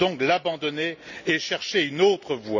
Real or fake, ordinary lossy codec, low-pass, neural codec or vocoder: real; none; 7.2 kHz; none